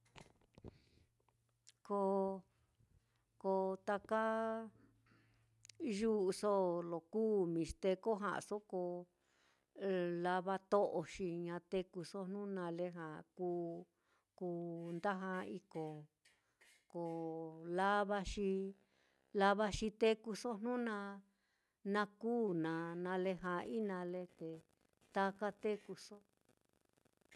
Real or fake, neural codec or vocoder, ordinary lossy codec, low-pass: real; none; none; none